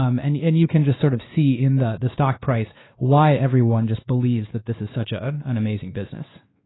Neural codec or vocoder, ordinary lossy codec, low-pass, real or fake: codec, 16 kHz, 2 kbps, X-Codec, WavLM features, trained on Multilingual LibriSpeech; AAC, 16 kbps; 7.2 kHz; fake